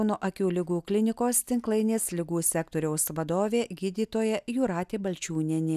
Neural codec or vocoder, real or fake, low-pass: none; real; 14.4 kHz